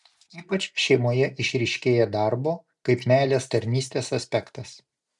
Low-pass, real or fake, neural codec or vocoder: 10.8 kHz; real; none